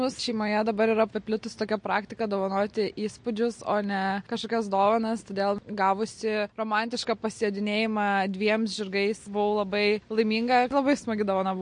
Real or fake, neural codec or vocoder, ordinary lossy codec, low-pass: real; none; MP3, 48 kbps; 9.9 kHz